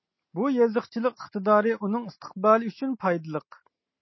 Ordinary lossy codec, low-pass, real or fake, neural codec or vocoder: MP3, 24 kbps; 7.2 kHz; real; none